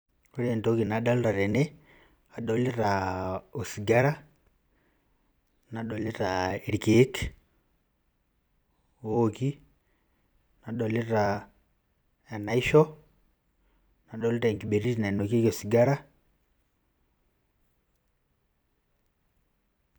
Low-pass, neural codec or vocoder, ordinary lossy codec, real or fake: none; vocoder, 44.1 kHz, 128 mel bands every 256 samples, BigVGAN v2; none; fake